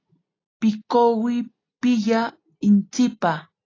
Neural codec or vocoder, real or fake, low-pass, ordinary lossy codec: none; real; 7.2 kHz; AAC, 32 kbps